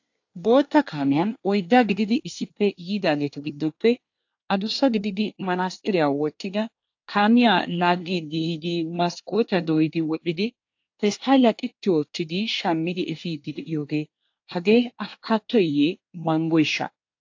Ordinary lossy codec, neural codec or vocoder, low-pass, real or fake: AAC, 48 kbps; codec, 24 kHz, 1 kbps, SNAC; 7.2 kHz; fake